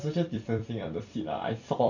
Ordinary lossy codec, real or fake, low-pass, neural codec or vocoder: none; fake; 7.2 kHz; vocoder, 44.1 kHz, 128 mel bands every 256 samples, BigVGAN v2